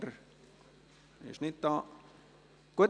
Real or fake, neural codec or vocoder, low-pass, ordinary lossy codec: real; none; 9.9 kHz; none